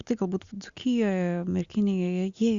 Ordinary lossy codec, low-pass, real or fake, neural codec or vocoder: Opus, 64 kbps; 7.2 kHz; real; none